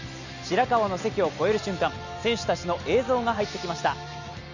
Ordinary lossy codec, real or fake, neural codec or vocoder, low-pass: none; real; none; 7.2 kHz